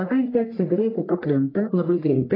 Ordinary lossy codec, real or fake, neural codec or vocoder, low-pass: AAC, 24 kbps; fake; codec, 44.1 kHz, 1.7 kbps, Pupu-Codec; 5.4 kHz